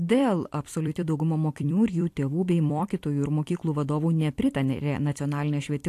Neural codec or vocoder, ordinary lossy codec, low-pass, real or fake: vocoder, 44.1 kHz, 128 mel bands every 256 samples, BigVGAN v2; AAC, 64 kbps; 14.4 kHz; fake